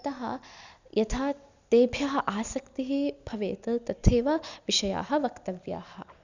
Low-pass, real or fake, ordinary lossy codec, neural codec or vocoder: 7.2 kHz; real; none; none